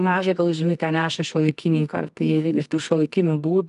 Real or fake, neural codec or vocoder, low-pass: fake; codec, 24 kHz, 0.9 kbps, WavTokenizer, medium music audio release; 10.8 kHz